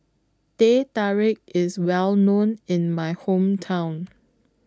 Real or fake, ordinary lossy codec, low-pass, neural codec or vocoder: real; none; none; none